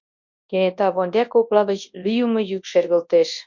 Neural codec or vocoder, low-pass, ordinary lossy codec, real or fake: codec, 24 kHz, 0.9 kbps, WavTokenizer, large speech release; 7.2 kHz; MP3, 48 kbps; fake